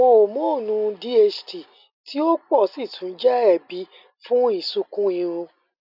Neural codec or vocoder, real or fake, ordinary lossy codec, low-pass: none; real; none; 5.4 kHz